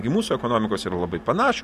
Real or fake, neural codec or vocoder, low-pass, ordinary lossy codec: real; none; 14.4 kHz; MP3, 64 kbps